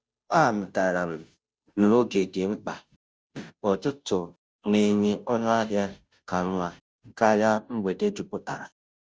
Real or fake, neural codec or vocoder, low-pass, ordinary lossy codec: fake; codec, 16 kHz, 0.5 kbps, FunCodec, trained on Chinese and English, 25 frames a second; none; none